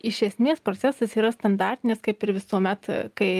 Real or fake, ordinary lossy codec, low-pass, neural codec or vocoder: real; Opus, 32 kbps; 14.4 kHz; none